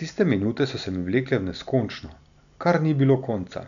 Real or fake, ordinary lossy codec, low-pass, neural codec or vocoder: real; none; 7.2 kHz; none